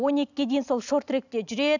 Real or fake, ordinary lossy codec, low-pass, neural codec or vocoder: real; none; 7.2 kHz; none